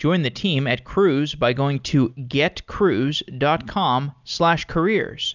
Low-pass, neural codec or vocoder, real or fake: 7.2 kHz; none; real